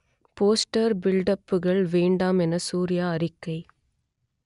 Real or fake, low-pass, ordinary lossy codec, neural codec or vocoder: real; 10.8 kHz; none; none